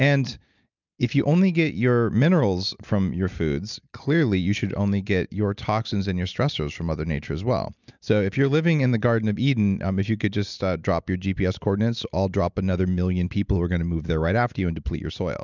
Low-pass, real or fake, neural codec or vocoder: 7.2 kHz; real; none